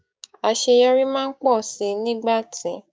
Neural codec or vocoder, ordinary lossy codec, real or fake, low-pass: codec, 16 kHz, 6 kbps, DAC; none; fake; none